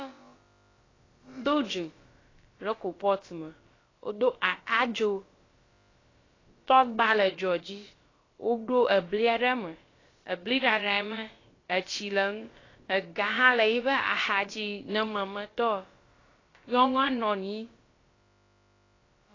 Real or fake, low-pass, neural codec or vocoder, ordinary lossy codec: fake; 7.2 kHz; codec, 16 kHz, about 1 kbps, DyCAST, with the encoder's durations; AAC, 32 kbps